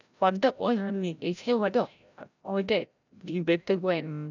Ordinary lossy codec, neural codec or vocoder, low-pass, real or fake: none; codec, 16 kHz, 0.5 kbps, FreqCodec, larger model; 7.2 kHz; fake